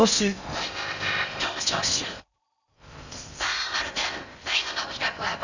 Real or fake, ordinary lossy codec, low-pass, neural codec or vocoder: fake; none; 7.2 kHz; codec, 16 kHz in and 24 kHz out, 0.6 kbps, FocalCodec, streaming, 4096 codes